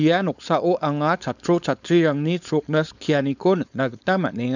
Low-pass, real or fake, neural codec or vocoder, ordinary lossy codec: 7.2 kHz; fake; codec, 16 kHz, 4.8 kbps, FACodec; none